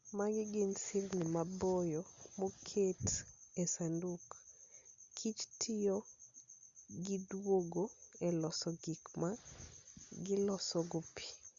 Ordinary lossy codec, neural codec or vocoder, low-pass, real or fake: Opus, 64 kbps; none; 7.2 kHz; real